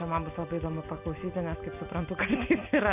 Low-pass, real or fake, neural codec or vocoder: 3.6 kHz; real; none